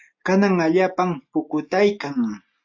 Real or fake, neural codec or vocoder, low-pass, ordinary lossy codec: real; none; 7.2 kHz; AAC, 48 kbps